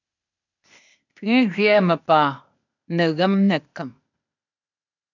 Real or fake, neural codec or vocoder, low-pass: fake; codec, 16 kHz, 0.8 kbps, ZipCodec; 7.2 kHz